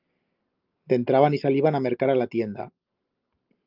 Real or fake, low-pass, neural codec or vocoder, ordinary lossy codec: real; 5.4 kHz; none; Opus, 24 kbps